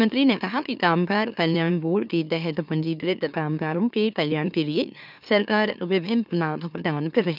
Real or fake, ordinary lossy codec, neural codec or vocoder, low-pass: fake; none; autoencoder, 44.1 kHz, a latent of 192 numbers a frame, MeloTTS; 5.4 kHz